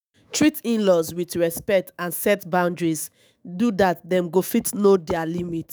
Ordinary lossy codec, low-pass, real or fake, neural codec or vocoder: none; none; fake; autoencoder, 48 kHz, 128 numbers a frame, DAC-VAE, trained on Japanese speech